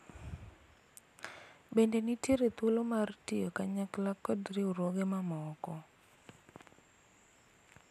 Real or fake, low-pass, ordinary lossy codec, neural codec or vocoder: real; 14.4 kHz; none; none